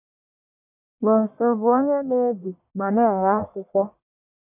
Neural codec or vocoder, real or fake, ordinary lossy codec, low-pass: codec, 44.1 kHz, 1.7 kbps, Pupu-Codec; fake; none; 3.6 kHz